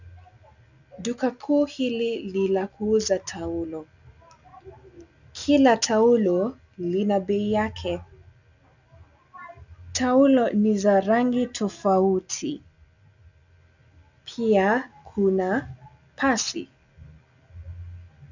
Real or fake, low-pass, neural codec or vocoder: real; 7.2 kHz; none